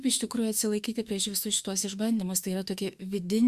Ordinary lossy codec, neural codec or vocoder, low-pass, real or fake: Opus, 64 kbps; autoencoder, 48 kHz, 32 numbers a frame, DAC-VAE, trained on Japanese speech; 14.4 kHz; fake